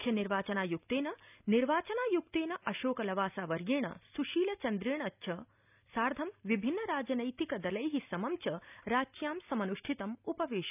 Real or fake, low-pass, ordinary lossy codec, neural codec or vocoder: real; 3.6 kHz; none; none